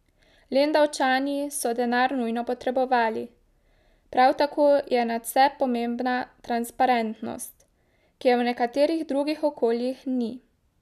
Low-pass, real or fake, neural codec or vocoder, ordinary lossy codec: 14.4 kHz; real; none; none